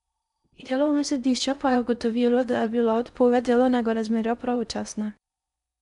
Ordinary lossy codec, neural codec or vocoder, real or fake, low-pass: MP3, 96 kbps; codec, 16 kHz in and 24 kHz out, 0.6 kbps, FocalCodec, streaming, 4096 codes; fake; 10.8 kHz